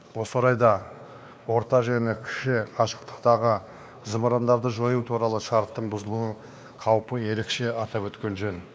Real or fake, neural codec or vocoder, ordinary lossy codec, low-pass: fake; codec, 16 kHz, 2 kbps, X-Codec, WavLM features, trained on Multilingual LibriSpeech; none; none